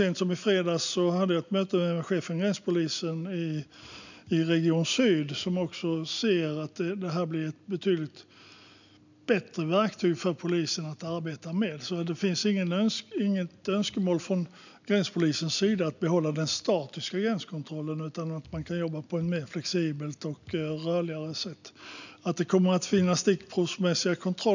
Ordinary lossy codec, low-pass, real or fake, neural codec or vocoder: none; 7.2 kHz; real; none